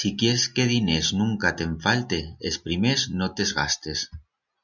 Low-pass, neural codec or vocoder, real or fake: 7.2 kHz; none; real